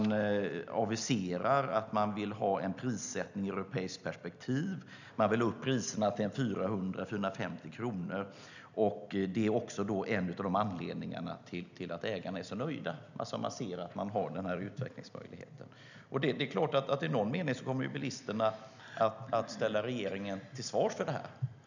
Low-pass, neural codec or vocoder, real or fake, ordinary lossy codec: 7.2 kHz; none; real; none